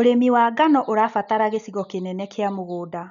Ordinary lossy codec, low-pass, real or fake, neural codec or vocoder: none; 7.2 kHz; real; none